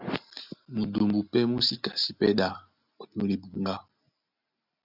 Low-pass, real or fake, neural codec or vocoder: 5.4 kHz; real; none